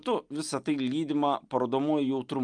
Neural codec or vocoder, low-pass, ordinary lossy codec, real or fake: none; 9.9 kHz; Opus, 32 kbps; real